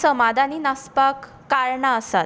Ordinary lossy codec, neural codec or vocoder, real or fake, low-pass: none; none; real; none